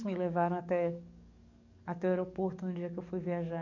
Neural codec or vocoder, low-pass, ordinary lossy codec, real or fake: codec, 44.1 kHz, 7.8 kbps, DAC; 7.2 kHz; none; fake